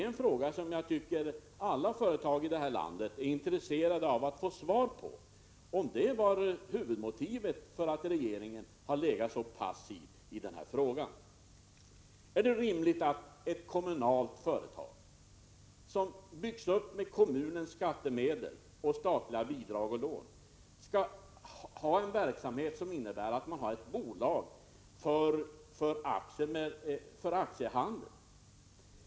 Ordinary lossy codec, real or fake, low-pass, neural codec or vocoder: none; real; none; none